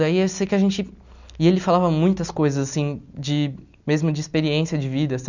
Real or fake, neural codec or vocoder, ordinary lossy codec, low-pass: real; none; none; 7.2 kHz